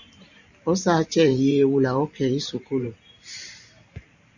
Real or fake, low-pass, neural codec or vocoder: fake; 7.2 kHz; vocoder, 44.1 kHz, 128 mel bands every 512 samples, BigVGAN v2